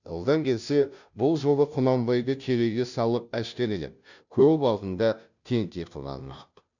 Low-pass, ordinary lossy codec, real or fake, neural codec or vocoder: 7.2 kHz; none; fake; codec, 16 kHz, 0.5 kbps, FunCodec, trained on Chinese and English, 25 frames a second